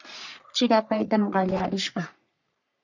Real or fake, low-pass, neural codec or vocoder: fake; 7.2 kHz; codec, 44.1 kHz, 3.4 kbps, Pupu-Codec